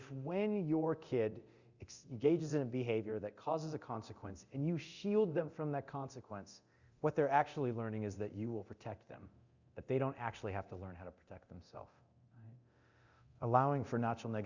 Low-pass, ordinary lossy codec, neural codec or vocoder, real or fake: 7.2 kHz; Opus, 64 kbps; codec, 24 kHz, 0.9 kbps, DualCodec; fake